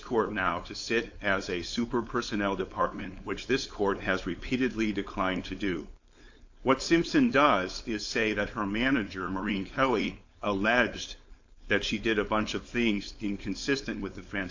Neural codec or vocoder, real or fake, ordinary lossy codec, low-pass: codec, 16 kHz, 4.8 kbps, FACodec; fake; AAC, 48 kbps; 7.2 kHz